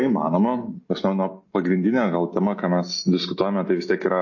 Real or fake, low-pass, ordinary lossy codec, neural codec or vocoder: real; 7.2 kHz; MP3, 32 kbps; none